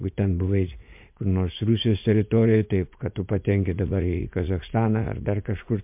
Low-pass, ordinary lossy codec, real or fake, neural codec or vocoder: 3.6 kHz; MP3, 32 kbps; fake; vocoder, 44.1 kHz, 80 mel bands, Vocos